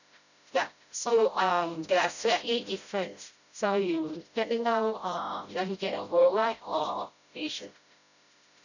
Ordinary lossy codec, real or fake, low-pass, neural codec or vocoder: none; fake; 7.2 kHz; codec, 16 kHz, 0.5 kbps, FreqCodec, smaller model